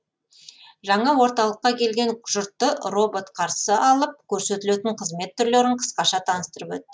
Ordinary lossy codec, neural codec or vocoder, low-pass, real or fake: none; none; none; real